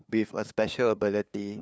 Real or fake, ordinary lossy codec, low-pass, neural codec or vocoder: fake; none; none; codec, 16 kHz, 2 kbps, FunCodec, trained on LibriTTS, 25 frames a second